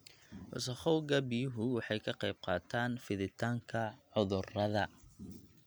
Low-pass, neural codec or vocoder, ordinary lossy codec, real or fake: none; none; none; real